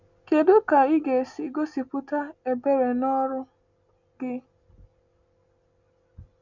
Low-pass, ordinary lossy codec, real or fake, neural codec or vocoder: 7.2 kHz; none; real; none